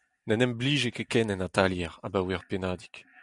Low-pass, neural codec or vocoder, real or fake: 10.8 kHz; none; real